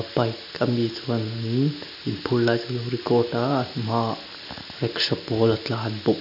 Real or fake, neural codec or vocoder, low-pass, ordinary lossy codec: real; none; 5.4 kHz; none